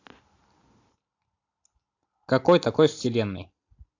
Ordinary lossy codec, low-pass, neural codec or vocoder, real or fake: AAC, 48 kbps; 7.2 kHz; none; real